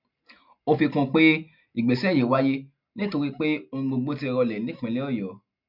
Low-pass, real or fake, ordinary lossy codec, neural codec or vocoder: 5.4 kHz; real; none; none